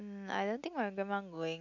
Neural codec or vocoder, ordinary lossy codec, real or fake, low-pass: none; none; real; 7.2 kHz